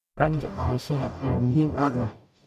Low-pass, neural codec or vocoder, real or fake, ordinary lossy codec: 14.4 kHz; codec, 44.1 kHz, 0.9 kbps, DAC; fake; none